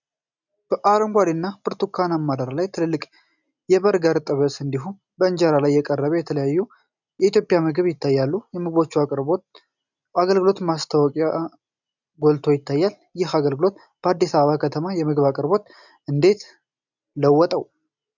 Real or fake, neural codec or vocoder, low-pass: real; none; 7.2 kHz